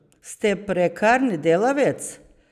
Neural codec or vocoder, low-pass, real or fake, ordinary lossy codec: none; 14.4 kHz; real; none